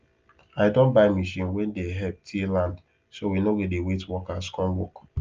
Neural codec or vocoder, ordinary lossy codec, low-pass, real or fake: none; Opus, 24 kbps; 7.2 kHz; real